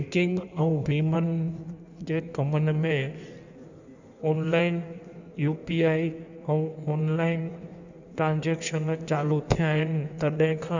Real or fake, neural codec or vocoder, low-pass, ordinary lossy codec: fake; codec, 16 kHz in and 24 kHz out, 1.1 kbps, FireRedTTS-2 codec; 7.2 kHz; none